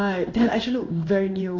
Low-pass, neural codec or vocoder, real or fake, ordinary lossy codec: 7.2 kHz; codec, 16 kHz in and 24 kHz out, 1 kbps, XY-Tokenizer; fake; AAC, 32 kbps